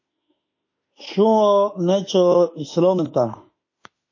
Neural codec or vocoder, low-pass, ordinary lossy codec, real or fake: autoencoder, 48 kHz, 32 numbers a frame, DAC-VAE, trained on Japanese speech; 7.2 kHz; MP3, 32 kbps; fake